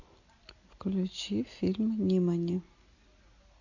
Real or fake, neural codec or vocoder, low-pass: real; none; 7.2 kHz